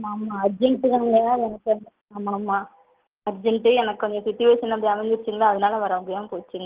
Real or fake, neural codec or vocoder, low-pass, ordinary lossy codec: real; none; 3.6 kHz; Opus, 16 kbps